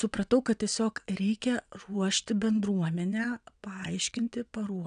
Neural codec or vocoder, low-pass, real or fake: vocoder, 22.05 kHz, 80 mel bands, WaveNeXt; 9.9 kHz; fake